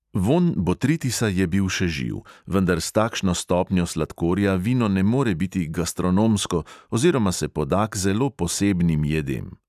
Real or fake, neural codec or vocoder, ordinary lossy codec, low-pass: real; none; none; 14.4 kHz